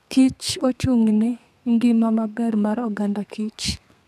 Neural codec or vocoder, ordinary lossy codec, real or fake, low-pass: codec, 32 kHz, 1.9 kbps, SNAC; none; fake; 14.4 kHz